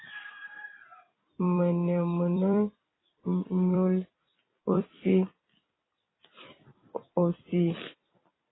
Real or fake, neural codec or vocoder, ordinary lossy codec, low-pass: fake; vocoder, 44.1 kHz, 128 mel bands every 256 samples, BigVGAN v2; AAC, 16 kbps; 7.2 kHz